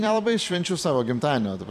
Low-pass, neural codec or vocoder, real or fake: 14.4 kHz; vocoder, 44.1 kHz, 128 mel bands every 256 samples, BigVGAN v2; fake